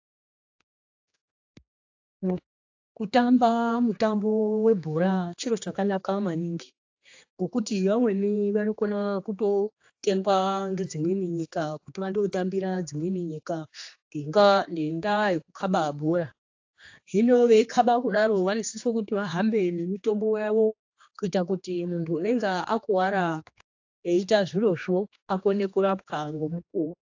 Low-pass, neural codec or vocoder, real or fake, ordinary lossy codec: 7.2 kHz; codec, 16 kHz, 2 kbps, X-Codec, HuBERT features, trained on general audio; fake; AAC, 48 kbps